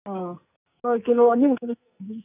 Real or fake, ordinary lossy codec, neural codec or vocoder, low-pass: fake; none; codec, 44.1 kHz, 2.6 kbps, SNAC; 3.6 kHz